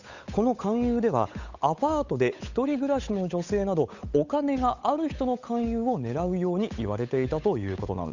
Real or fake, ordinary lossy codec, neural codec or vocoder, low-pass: fake; none; codec, 16 kHz, 8 kbps, FunCodec, trained on Chinese and English, 25 frames a second; 7.2 kHz